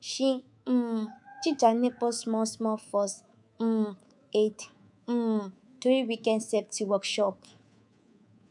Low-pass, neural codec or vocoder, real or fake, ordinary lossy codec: 10.8 kHz; codec, 24 kHz, 3.1 kbps, DualCodec; fake; none